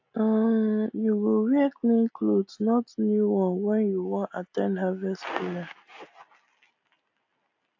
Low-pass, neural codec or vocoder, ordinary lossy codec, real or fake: 7.2 kHz; none; AAC, 48 kbps; real